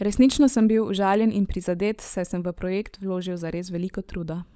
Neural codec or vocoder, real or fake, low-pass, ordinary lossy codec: codec, 16 kHz, 16 kbps, FunCodec, trained on LibriTTS, 50 frames a second; fake; none; none